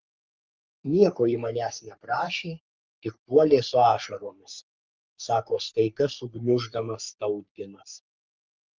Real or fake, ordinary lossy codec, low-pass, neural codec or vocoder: fake; Opus, 24 kbps; 7.2 kHz; codec, 44.1 kHz, 3.4 kbps, Pupu-Codec